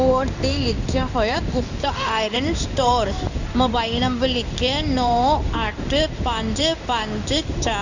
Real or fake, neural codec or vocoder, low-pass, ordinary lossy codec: fake; codec, 16 kHz in and 24 kHz out, 1 kbps, XY-Tokenizer; 7.2 kHz; none